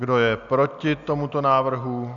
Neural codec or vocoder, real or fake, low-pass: none; real; 7.2 kHz